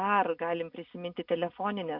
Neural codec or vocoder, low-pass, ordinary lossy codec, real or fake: none; 5.4 kHz; MP3, 48 kbps; real